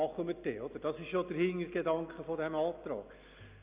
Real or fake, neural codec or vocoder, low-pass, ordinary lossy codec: real; none; 3.6 kHz; Opus, 64 kbps